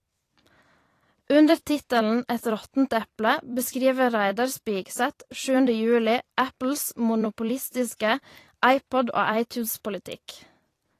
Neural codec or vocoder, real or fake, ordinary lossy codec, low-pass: vocoder, 44.1 kHz, 128 mel bands every 256 samples, BigVGAN v2; fake; AAC, 48 kbps; 14.4 kHz